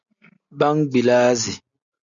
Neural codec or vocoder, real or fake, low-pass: none; real; 7.2 kHz